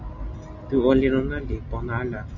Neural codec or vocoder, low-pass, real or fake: none; 7.2 kHz; real